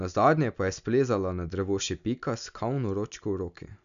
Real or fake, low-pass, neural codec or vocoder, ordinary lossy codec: real; 7.2 kHz; none; none